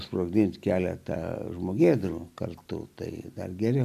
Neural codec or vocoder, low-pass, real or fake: none; 14.4 kHz; real